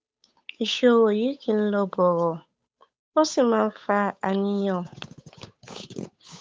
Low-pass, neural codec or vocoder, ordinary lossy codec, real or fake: none; codec, 16 kHz, 8 kbps, FunCodec, trained on Chinese and English, 25 frames a second; none; fake